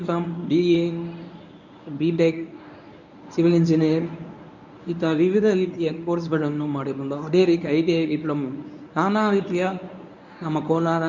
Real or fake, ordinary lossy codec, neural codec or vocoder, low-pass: fake; none; codec, 24 kHz, 0.9 kbps, WavTokenizer, medium speech release version 1; 7.2 kHz